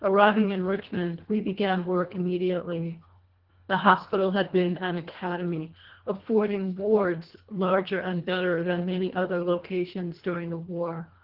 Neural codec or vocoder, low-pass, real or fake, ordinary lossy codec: codec, 24 kHz, 1.5 kbps, HILCodec; 5.4 kHz; fake; Opus, 16 kbps